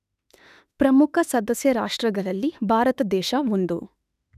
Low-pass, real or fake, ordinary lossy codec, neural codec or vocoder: 14.4 kHz; fake; none; autoencoder, 48 kHz, 32 numbers a frame, DAC-VAE, trained on Japanese speech